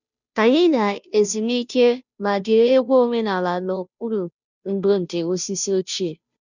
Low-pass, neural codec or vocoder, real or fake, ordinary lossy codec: 7.2 kHz; codec, 16 kHz, 0.5 kbps, FunCodec, trained on Chinese and English, 25 frames a second; fake; none